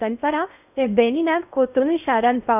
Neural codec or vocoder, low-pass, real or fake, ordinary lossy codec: codec, 16 kHz in and 24 kHz out, 0.6 kbps, FocalCodec, streaming, 4096 codes; 3.6 kHz; fake; none